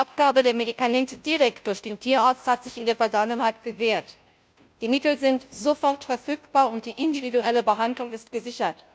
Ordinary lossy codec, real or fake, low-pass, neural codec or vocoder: none; fake; none; codec, 16 kHz, 0.5 kbps, FunCodec, trained on Chinese and English, 25 frames a second